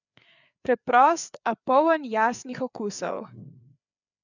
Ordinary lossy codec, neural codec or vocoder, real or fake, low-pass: none; codec, 16 kHz, 4 kbps, FreqCodec, larger model; fake; 7.2 kHz